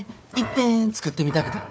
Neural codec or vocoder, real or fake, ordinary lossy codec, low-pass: codec, 16 kHz, 4 kbps, FunCodec, trained on Chinese and English, 50 frames a second; fake; none; none